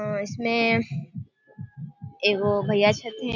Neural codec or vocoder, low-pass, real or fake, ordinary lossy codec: none; 7.2 kHz; real; none